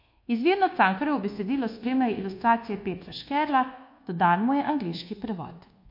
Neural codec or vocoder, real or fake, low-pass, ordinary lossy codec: codec, 24 kHz, 1.2 kbps, DualCodec; fake; 5.4 kHz; MP3, 32 kbps